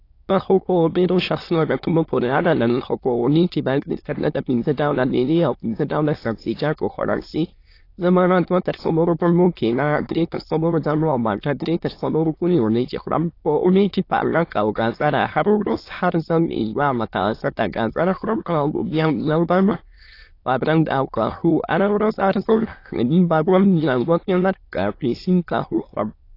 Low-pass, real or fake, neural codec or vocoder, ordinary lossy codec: 5.4 kHz; fake; autoencoder, 22.05 kHz, a latent of 192 numbers a frame, VITS, trained on many speakers; AAC, 32 kbps